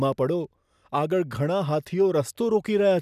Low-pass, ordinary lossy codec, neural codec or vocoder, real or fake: 14.4 kHz; none; none; real